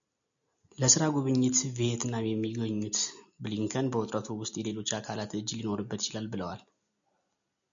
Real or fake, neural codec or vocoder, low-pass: real; none; 7.2 kHz